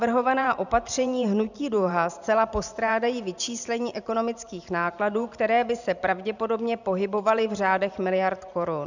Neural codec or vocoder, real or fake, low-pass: vocoder, 44.1 kHz, 128 mel bands every 512 samples, BigVGAN v2; fake; 7.2 kHz